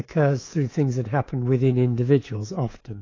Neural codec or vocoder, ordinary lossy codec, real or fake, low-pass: codec, 24 kHz, 3.1 kbps, DualCodec; AAC, 32 kbps; fake; 7.2 kHz